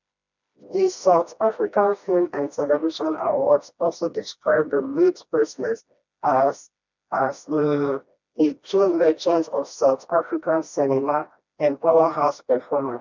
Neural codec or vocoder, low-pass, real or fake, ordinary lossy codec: codec, 16 kHz, 1 kbps, FreqCodec, smaller model; 7.2 kHz; fake; MP3, 64 kbps